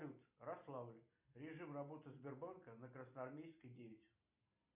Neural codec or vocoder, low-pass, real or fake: none; 3.6 kHz; real